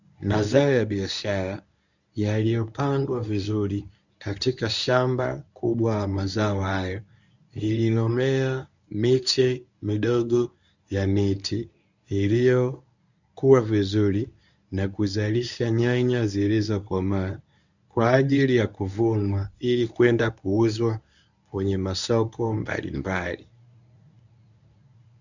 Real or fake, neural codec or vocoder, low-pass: fake; codec, 24 kHz, 0.9 kbps, WavTokenizer, medium speech release version 1; 7.2 kHz